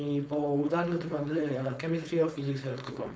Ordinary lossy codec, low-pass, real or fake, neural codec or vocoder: none; none; fake; codec, 16 kHz, 4.8 kbps, FACodec